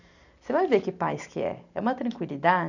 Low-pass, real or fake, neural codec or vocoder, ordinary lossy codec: 7.2 kHz; real; none; none